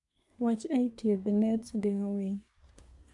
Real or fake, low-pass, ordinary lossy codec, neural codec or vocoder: fake; 10.8 kHz; none; codec, 24 kHz, 1 kbps, SNAC